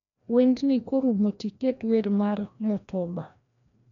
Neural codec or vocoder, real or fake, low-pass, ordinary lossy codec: codec, 16 kHz, 1 kbps, FreqCodec, larger model; fake; 7.2 kHz; none